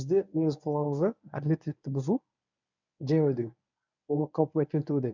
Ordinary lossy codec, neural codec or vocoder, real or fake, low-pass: none; codec, 24 kHz, 0.9 kbps, WavTokenizer, medium speech release version 1; fake; 7.2 kHz